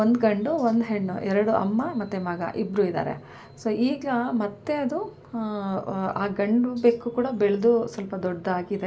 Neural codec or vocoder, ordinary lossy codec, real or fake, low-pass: none; none; real; none